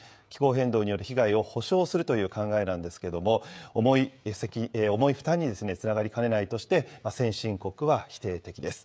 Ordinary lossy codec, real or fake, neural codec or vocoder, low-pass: none; fake; codec, 16 kHz, 16 kbps, FreqCodec, smaller model; none